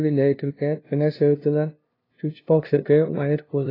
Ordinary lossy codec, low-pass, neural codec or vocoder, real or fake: AAC, 24 kbps; 5.4 kHz; codec, 16 kHz, 0.5 kbps, FunCodec, trained on LibriTTS, 25 frames a second; fake